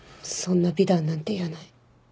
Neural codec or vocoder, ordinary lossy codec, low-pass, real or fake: none; none; none; real